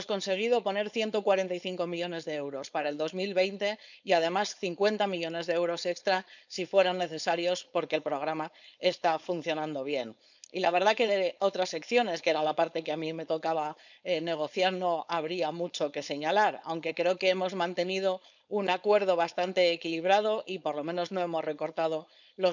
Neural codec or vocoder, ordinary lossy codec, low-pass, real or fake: codec, 16 kHz, 4.8 kbps, FACodec; none; 7.2 kHz; fake